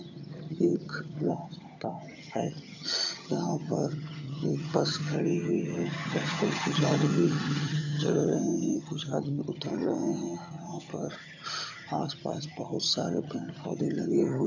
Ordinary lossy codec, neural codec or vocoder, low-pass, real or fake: none; vocoder, 22.05 kHz, 80 mel bands, HiFi-GAN; 7.2 kHz; fake